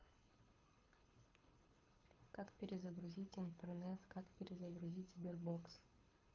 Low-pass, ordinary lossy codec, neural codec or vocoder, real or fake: 7.2 kHz; Opus, 32 kbps; codec, 24 kHz, 6 kbps, HILCodec; fake